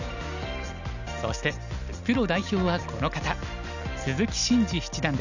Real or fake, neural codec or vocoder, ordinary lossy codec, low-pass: real; none; none; 7.2 kHz